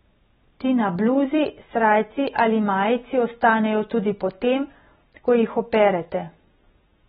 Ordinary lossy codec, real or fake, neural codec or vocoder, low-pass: AAC, 16 kbps; real; none; 19.8 kHz